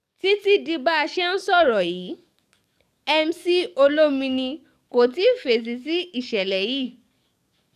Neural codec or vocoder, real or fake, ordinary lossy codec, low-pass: codec, 44.1 kHz, 7.8 kbps, DAC; fake; none; 14.4 kHz